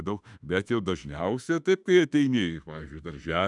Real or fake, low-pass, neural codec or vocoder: fake; 10.8 kHz; autoencoder, 48 kHz, 32 numbers a frame, DAC-VAE, trained on Japanese speech